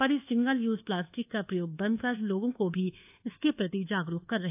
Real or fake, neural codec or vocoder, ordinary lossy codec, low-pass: fake; codec, 24 kHz, 1.2 kbps, DualCodec; AAC, 32 kbps; 3.6 kHz